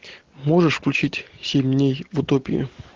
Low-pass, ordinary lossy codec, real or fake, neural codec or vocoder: 7.2 kHz; Opus, 16 kbps; real; none